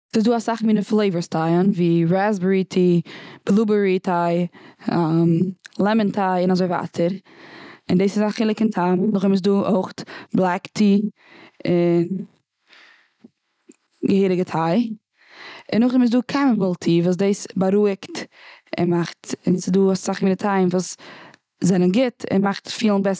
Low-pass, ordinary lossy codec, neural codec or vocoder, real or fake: none; none; none; real